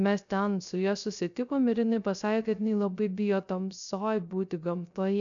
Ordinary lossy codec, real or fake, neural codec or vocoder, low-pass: MP3, 96 kbps; fake; codec, 16 kHz, 0.3 kbps, FocalCodec; 7.2 kHz